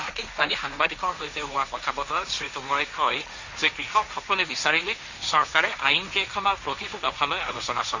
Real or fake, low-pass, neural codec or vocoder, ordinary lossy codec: fake; 7.2 kHz; codec, 16 kHz, 1.1 kbps, Voila-Tokenizer; Opus, 64 kbps